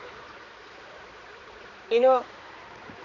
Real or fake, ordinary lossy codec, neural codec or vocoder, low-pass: fake; none; codec, 16 kHz, 4 kbps, X-Codec, HuBERT features, trained on balanced general audio; 7.2 kHz